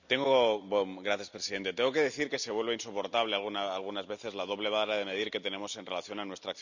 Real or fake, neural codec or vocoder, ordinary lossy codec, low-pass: real; none; none; 7.2 kHz